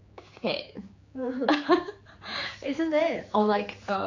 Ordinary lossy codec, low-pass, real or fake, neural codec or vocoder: AAC, 48 kbps; 7.2 kHz; fake; codec, 16 kHz, 4 kbps, X-Codec, HuBERT features, trained on general audio